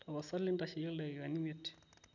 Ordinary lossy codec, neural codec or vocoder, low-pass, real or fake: none; none; 7.2 kHz; real